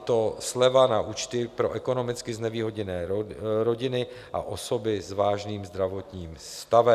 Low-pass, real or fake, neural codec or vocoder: 14.4 kHz; real; none